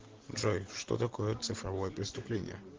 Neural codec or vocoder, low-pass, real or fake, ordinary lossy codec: none; 7.2 kHz; real; Opus, 16 kbps